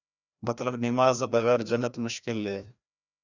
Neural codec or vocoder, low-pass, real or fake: codec, 16 kHz, 1 kbps, FreqCodec, larger model; 7.2 kHz; fake